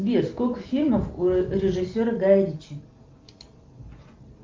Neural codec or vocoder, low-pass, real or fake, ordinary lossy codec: none; 7.2 kHz; real; Opus, 32 kbps